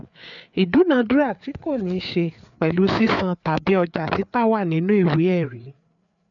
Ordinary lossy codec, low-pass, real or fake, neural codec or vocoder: none; 7.2 kHz; fake; codec, 16 kHz, 4 kbps, FreqCodec, larger model